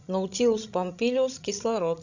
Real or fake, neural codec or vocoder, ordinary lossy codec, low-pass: fake; codec, 16 kHz, 16 kbps, FreqCodec, larger model; none; 7.2 kHz